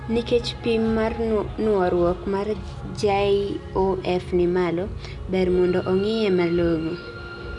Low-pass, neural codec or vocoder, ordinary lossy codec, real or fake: 10.8 kHz; none; none; real